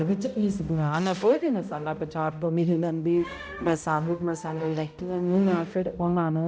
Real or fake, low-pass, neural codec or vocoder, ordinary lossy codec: fake; none; codec, 16 kHz, 0.5 kbps, X-Codec, HuBERT features, trained on balanced general audio; none